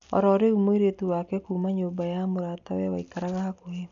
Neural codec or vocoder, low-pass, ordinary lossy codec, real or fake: none; 7.2 kHz; none; real